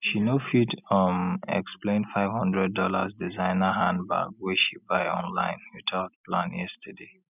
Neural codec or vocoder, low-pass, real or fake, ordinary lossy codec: none; 3.6 kHz; real; none